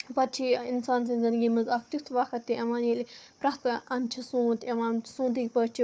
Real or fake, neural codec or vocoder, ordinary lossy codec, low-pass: fake; codec, 16 kHz, 4 kbps, FunCodec, trained on Chinese and English, 50 frames a second; none; none